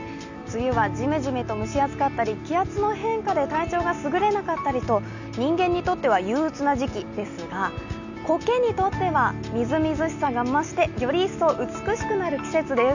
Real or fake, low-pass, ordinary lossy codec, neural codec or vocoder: real; 7.2 kHz; none; none